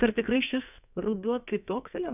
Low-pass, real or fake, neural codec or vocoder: 3.6 kHz; fake; codec, 44.1 kHz, 2.6 kbps, SNAC